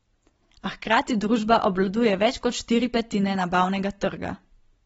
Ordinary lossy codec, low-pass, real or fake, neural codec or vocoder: AAC, 24 kbps; 19.8 kHz; real; none